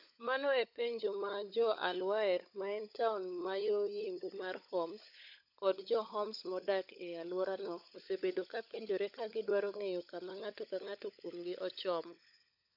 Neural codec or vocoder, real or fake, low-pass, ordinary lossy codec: codec, 16 kHz, 16 kbps, FunCodec, trained on LibriTTS, 50 frames a second; fake; 5.4 kHz; none